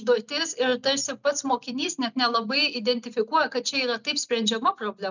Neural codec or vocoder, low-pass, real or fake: none; 7.2 kHz; real